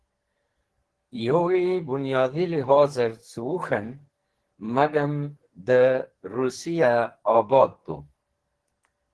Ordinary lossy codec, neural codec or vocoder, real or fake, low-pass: Opus, 16 kbps; codec, 44.1 kHz, 2.6 kbps, SNAC; fake; 10.8 kHz